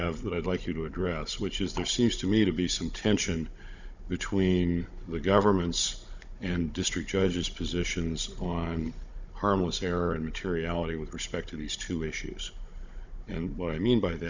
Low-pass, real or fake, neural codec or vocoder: 7.2 kHz; fake; codec, 16 kHz, 16 kbps, FunCodec, trained on Chinese and English, 50 frames a second